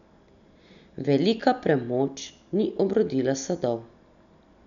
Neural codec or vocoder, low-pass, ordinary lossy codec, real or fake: none; 7.2 kHz; none; real